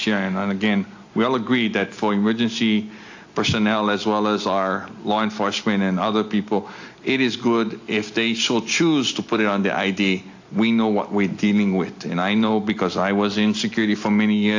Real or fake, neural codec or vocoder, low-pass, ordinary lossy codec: real; none; 7.2 kHz; AAC, 48 kbps